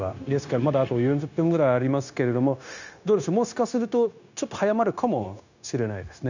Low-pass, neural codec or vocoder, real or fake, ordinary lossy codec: 7.2 kHz; codec, 16 kHz, 0.9 kbps, LongCat-Audio-Codec; fake; none